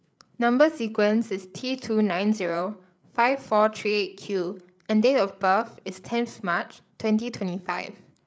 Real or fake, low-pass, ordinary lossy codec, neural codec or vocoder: fake; none; none; codec, 16 kHz, 8 kbps, FreqCodec, larger model